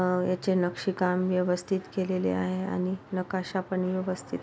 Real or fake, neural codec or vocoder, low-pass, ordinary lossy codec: real; none; none; none